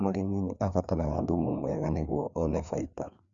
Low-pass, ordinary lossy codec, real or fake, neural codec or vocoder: 7.2 kHz; none; fake; codec, 16 kHz, 2 kbps, FreqCodec, larger model